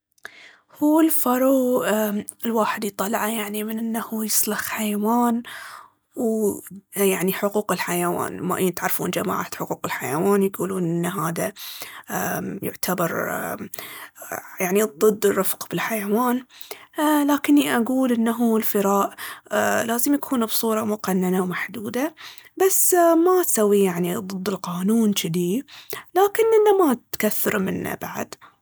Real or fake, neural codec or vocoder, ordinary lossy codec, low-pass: real; none; none; none